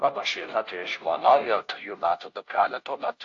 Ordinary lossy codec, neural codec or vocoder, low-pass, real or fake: AAC, 48 kbps; codec, 16 kHz, 0.5 kbps, FunCodec, trained on Chinese and English, 25 frames a second; 7.2 kHz; fake